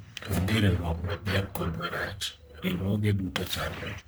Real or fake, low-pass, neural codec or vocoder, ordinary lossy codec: fake; none; codec, 44.1 kHz, 1.7 kbps, Pupu-Codec; none